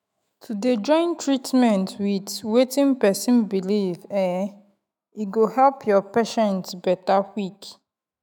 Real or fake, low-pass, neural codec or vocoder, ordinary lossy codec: fake; 19.8 kHz; autoencoder, 48 kHz, 128 numbers a frame, DAC-VAE, trained on Japanese speech; none